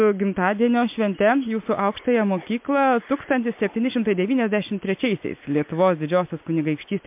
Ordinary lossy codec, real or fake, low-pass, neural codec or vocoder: MP3, 32 kbps; real; 3.6 kHz; none